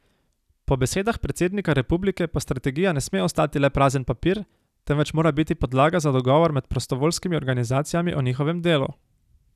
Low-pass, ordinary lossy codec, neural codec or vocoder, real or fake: 14.4 kHz; none; none; real